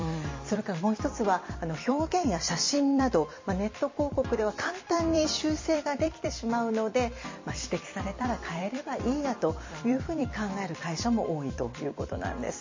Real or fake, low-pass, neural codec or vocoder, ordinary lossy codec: fake; 7.2 kHz; vocoder, 44.1 kHz, 128 mel bands every 256 samples, BigVGAN v2; MP3, 32 kbps